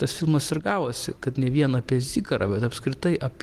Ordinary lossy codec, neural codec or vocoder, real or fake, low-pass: Opus, 24 kbps; vocoder, 44.1 kHz, 128 mel bands every 256 samples, BigVGAN v2; fake; 14.4 kHz